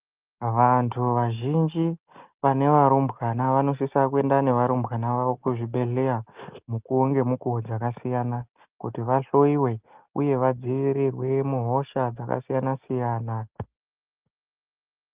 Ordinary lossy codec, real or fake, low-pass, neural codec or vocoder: Opus, 32 kbps; real; 3.6 kHz; none